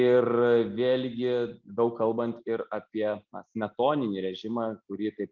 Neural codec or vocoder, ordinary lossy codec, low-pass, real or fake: none; Opus, 24 kbps; 7.2 kHz; real